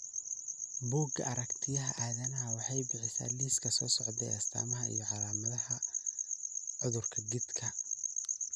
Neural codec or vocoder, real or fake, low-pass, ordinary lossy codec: none; real; none; none